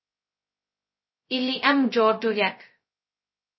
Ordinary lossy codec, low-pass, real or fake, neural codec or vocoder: MP3, 24 kbps; 7.2 kHz; fake; codec, 16 kHz, 0.2 kbps, FocalCodec